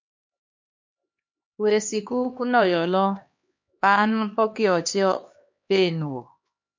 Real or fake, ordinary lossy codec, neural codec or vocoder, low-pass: fake; MP3, 48 kbps; codec, 16 kHz, 2 kbps, X-Codec, HuBERT features, trained on LibriSpeech; 7.2 kHz